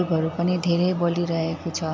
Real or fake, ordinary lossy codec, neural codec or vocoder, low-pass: real; MP3, 64 kbps; none; 7.2 kHz